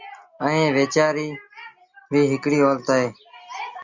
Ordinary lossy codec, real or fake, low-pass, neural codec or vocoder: Opus, 64 kbps; real; 7.2 kHz; none